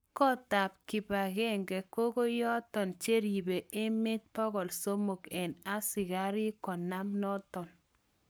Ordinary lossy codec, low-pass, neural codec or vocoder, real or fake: none; none; codec, 44.1 kHz, 7.8 kbps, Pupu-Codec; fake